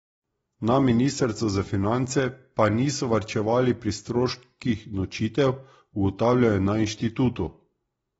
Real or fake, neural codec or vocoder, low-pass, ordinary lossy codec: real; none; 19.8 kHz; AAC, 24 kbps